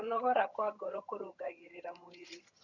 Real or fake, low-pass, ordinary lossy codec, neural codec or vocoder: fake; 7.2 kHz; none; vocoder, 22.05 kHz, 80 mel bands, HiFi-GAN